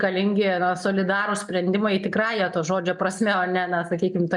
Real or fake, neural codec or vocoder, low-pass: real; none; 10.8 kHz